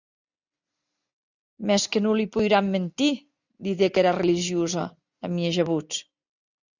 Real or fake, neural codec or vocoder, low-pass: real; none; 7.2 kHz